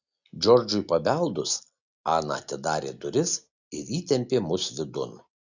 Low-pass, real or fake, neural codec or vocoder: 7.2 kHz; real; none